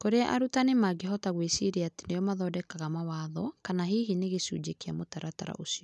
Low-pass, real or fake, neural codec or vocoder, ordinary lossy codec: none; real; none; none